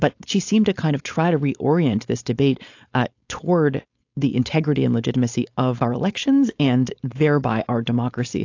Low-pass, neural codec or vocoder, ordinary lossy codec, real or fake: 7.2 kHz; codec, 16 kHz, 4.8 kbps, FACodec; AAC, 48 kbps; fake